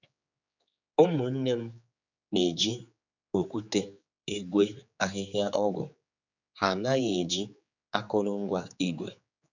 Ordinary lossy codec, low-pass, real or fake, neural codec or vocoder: none; 7.2 kHz; fake; codec, 16 kHz, 4 kbps, X-Codec, HuBERT features, trained on general audio